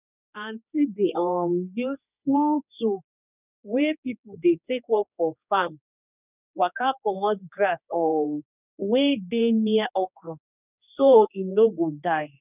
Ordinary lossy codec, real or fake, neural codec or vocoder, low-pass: none; fake; codec, 16 kHz, 2 kbps, X-Codec, HuBERT features, trained on general audio; 3.6 kHz